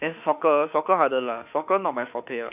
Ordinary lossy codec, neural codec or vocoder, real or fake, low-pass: none; autoencoder, 48 kHz, 32 numbers a frame, DAC-VAE, trained on Japanese speech; fake; 3.6 kHz